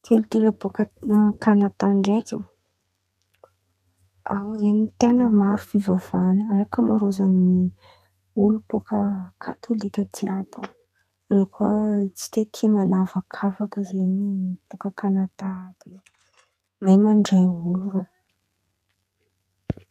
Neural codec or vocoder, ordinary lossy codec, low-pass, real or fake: codec, 32 kHz, 1.9 kbps, SNAC; none; 14.4 kHz; fake